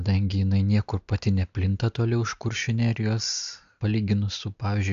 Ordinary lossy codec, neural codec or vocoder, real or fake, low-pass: AAC, 64 kbps; none; real; 7.2 kHz